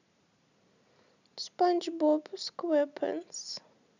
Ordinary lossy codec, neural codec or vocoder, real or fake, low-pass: none; none; real; 7.2 kHz